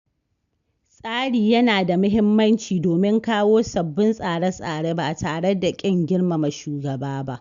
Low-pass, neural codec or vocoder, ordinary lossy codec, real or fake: 7.2 kHz; none; none; real